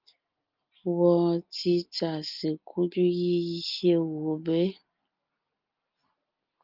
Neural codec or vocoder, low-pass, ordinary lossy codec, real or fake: none; 5.4 kHz; Opus, 24 kbps; real